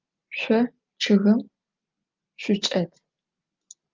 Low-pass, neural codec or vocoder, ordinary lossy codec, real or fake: 7.2 kHz; none; Opus, 32 kbps; real